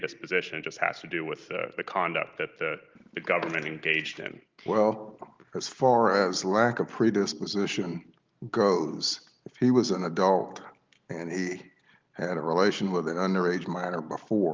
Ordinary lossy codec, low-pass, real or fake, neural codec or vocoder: Opus, 24 kbps; 7.2 kHz; real; none